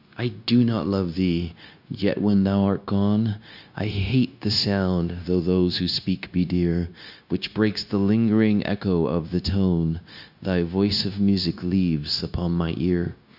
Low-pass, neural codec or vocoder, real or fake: 5.4 kHz; codec, 16 kHz, 0.9 kbps, LongCat-Audio-Codec; fake